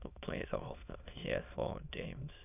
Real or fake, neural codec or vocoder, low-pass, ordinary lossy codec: fake; autoencoder, 22.05 kHz, a latent of 192 numbers a frame, VITS, trained on many speakers; 3.6 kHz; none